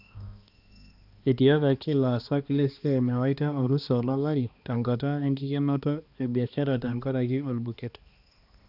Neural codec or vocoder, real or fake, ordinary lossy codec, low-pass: codec, 16 kHz, 2 kbps, X-Codec, HuBERT features, trained on balanced general audio; fake; none; 5.4 kHz